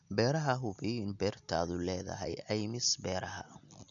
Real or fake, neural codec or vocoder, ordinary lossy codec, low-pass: real; none; none; 7.2 kHz